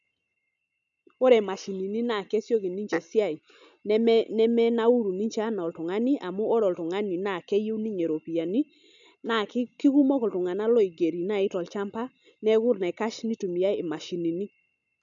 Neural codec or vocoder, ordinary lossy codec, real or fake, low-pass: none; none; real; 7.2 kHz